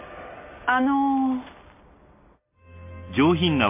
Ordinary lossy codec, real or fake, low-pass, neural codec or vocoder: MP3, 24 kbps; real; 3.6 kHz; none